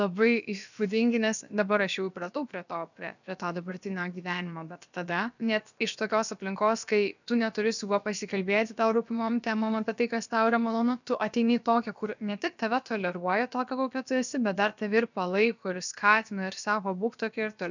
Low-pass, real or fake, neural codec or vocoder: 7.2 kHz; fake; codec, 16 kHz, about 1 kbps, DyCAST, with the encoder's durations